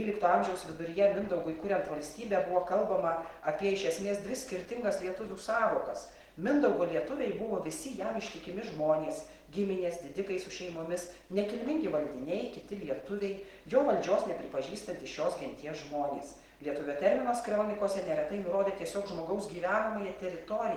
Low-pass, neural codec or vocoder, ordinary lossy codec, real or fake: 19.8 kHz; none; Opus, 16 kbps; real